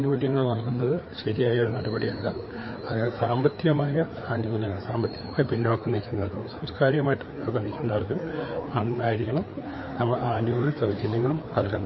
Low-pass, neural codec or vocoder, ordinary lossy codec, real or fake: 7.2 kHz; codec, 16 kHz, 4 kbps, FreqCodec, larger model; MP3, 24 kbps; fake